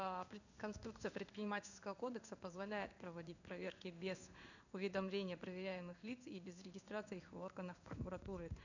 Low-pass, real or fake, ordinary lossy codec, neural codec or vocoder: 7.2 kHz; fake; none; codec, 16 kHz in and 24 kHz out, 1 kbps, XY-Tokenizer